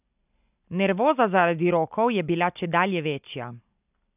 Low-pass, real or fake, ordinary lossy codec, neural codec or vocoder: 3.6 kHz; real; none; none